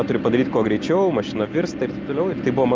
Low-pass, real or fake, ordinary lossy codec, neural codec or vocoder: 7.2 kHz; real; Opus, 32 kbps; none